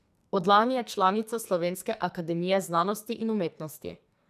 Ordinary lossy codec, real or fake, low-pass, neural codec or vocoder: none; fake; 14.4 kHz; codec, 44.1 kHz, 2.6 kbps, SNAC